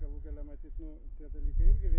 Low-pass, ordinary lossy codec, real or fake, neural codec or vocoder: 3.6 kHz; MP3, 16 kbps; real; none